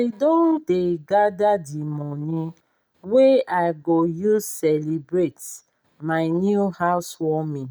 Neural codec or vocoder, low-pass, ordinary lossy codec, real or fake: none; none; none; real